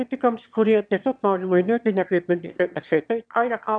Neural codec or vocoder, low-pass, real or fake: autoencoder, 22.05 kHz, a latent of 192 numbers a frame, VITS, trained on one speaker; 9.9 kHz; fake